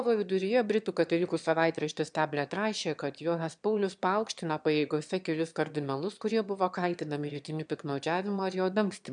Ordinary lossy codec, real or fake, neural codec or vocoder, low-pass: MP3, 96 kbps; fake; autoencoder, 22.05 kHz, a latent of 192 numbers a frame, VITS, trained on one speaker; 9.9 kHz